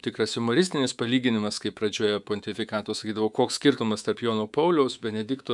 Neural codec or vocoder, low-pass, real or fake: codec, 24 kHz, 3.1 kbps, DualCodec; 10.8 kHz; fake